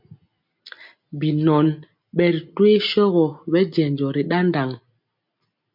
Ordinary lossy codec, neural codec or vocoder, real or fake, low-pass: MP3, 48 kbps; none; real; 5.4 kHz